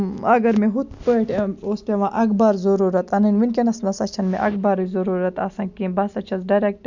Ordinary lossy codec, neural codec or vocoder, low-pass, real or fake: none; none; 7.2 kHz; real